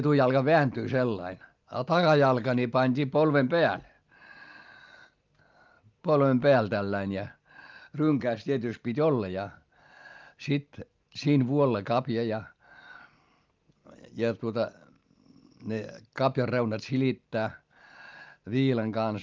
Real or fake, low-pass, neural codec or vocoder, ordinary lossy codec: real; 7.2 kHz; none; Opus, 32 kbps